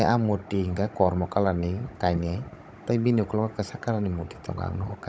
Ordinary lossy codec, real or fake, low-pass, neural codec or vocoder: none; fake; none; codec, 16 kHz, 16 kbps, FunCodec, trained on Chinese and English, 50 frames a second